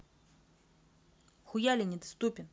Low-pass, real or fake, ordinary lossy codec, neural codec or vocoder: none; real; none; none